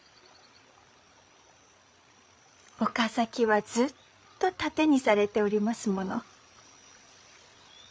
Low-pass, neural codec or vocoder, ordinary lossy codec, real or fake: none; codec, 16 kHz, 8 kbps, FreqCodec, larger model; none; fake